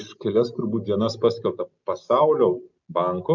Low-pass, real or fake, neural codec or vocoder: 7.2 kHz; real; none